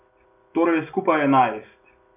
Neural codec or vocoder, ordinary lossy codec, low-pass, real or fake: none; none; 3.6 kHz; real